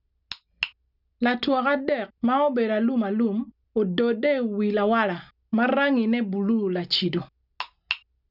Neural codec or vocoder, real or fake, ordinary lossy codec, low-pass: none; real; none; 5.4 kHz